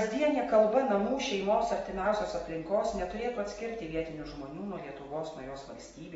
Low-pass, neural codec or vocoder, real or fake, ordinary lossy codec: 19.8 kHz; none; real; AAC, 24 kbps